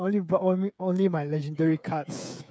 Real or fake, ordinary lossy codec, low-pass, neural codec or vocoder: fake; none; none; codec, 16 kHz, 8 kbps, FreqCodec, smaller model